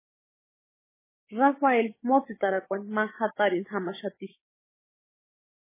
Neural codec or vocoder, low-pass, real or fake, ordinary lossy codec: codec, 16 kHz, 6 kbps, DAC; 3.6 kHz; fake; MP3, 16 kbps